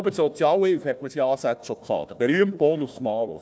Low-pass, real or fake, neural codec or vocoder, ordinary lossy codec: none; fake; codec, 16 kHz, 1 kbps, FunCodec, trained on Chinese and English, 50 frames a second; none